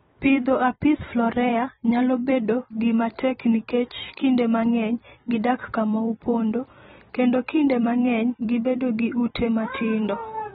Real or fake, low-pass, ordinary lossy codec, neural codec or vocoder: fake; 19.8 kHz; AAC, 16 kbps; vocoder, 44.1 kHz, 128 mel bands every 256 samples, BigVGAN v2